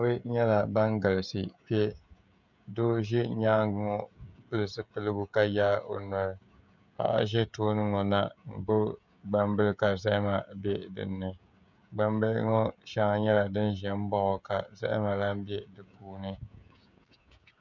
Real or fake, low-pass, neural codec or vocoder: fake; 7.2 kHz; codec, 16 kHz, 16 kbps, FreqCodec, smaller model